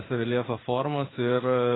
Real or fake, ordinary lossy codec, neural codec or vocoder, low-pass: fake; AAC, 16 kbps; codec, 16 kHz in and 24 kHz out, 1 kbps, XY-Tokenizer; 7.2 kHz